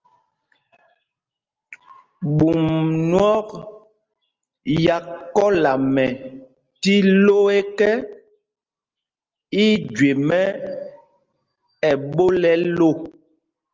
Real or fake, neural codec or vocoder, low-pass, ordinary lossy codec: real; none; 7.2 kHz; Opus, 24 kbps